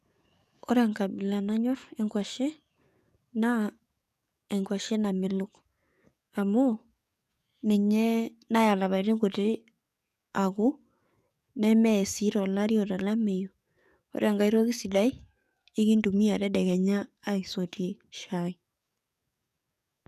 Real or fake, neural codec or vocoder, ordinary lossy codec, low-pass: fake; codec, 44.1 kHz, 7.8 kbps, DAC; none; 14.4 kHz